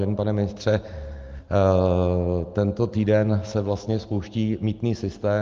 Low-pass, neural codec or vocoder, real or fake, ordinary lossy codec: 7.2 kHz; none; real; Opus, 32 kbps